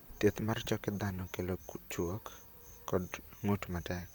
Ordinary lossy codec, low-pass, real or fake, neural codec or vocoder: none; none; fake; vocoder, 44.1 kHz, 128 mel bands, Pupu-Vocoder